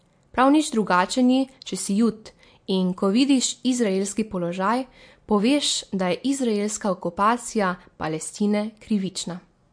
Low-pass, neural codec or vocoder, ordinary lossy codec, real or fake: 9.9 kHz; none; MP3, 48 kbps; real